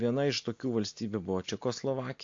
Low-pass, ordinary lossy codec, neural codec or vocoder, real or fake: 7.2 kHz; AAC, 48 kbps; none; real